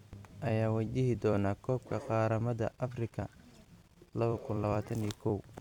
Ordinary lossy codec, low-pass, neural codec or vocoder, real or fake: none; 19.8 kHz; vocoder, 44.1 kHz, 128 mel bands every 256 samples, BigVGAN v2; fake